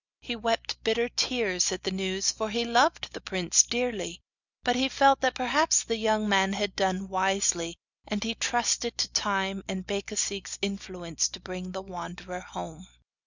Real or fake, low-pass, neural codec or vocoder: real; 7.2 kHz; none